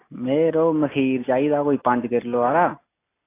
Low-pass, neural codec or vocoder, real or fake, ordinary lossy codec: 3.6 kHz; none; real; AAC, 24 kbps